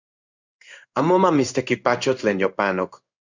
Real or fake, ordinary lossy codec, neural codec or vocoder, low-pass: fake; Opus, 64 kbps; codec, 16 kHz in and 24 kHz out, 1 kbps, XY-Tokenizer; 7.2 kHz